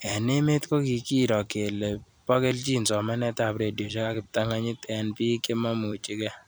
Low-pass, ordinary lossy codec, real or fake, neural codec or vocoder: none; none; fake; vocoder, 44.1 kHz, 128 mel bands every 256 samples, BigVGAN v2